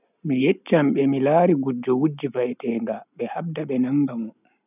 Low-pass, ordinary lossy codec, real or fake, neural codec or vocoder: 3.6 kHz; none; real; none